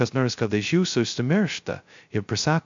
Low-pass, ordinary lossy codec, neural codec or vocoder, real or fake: 7.2 kHz; MP3, 64 kbps; codec, 16 kHz, 0.2 kbps, FocalCodec; fake